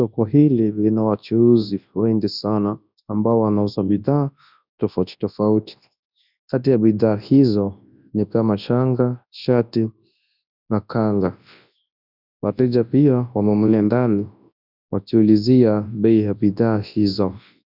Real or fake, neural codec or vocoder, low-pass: fake; codec, 24 kHz, 0.9 kbps, WavTokenizer, large speech release; 5.4 kHz